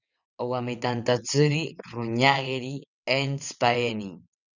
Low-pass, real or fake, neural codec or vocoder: 7.2 kHz; fake; vocoder, 22.05 kHz, 80 mel bands, WaveNeXt